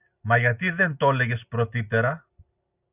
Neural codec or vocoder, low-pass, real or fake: none; 3.6 kHz; real